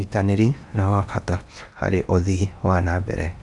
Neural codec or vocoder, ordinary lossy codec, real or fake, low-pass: codec, 16 kHz in and 24 kHz out, 0.8 kbps, FocalCodec, streaming, 65536 codes; none; fake; 10.8 kHz